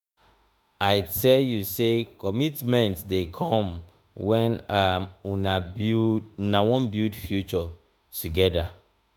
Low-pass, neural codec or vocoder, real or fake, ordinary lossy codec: none; autoencoder, 48 kHz, 32 numbers a frame, DAC-VAE, trained on Japanese speech; fake; none